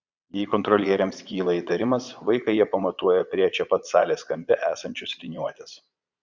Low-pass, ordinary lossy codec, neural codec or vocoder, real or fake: 7.2 kHz; Opus, 64 kbps; vocoder, 22.05 kHz, 80 mel bands, Vocos; fake